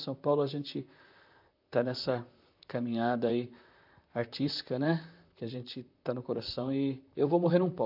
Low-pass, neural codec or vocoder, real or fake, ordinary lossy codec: 5.4 kHz; vocoder, 44.1 kHz, 128 mel bands, Pupu-Vocoder; fake; AAC, 48 kbps